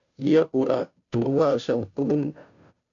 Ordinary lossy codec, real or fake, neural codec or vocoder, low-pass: AAC, 64 kbps; fake; codec, 16 kHz, 0.5 kbps, FunCodec, trained on Chinese and English, 25 frames a second; 7.2 kHz